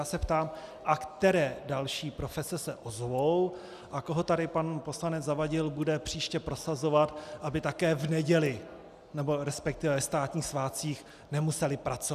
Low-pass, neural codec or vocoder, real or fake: 14.4 kHz; none; real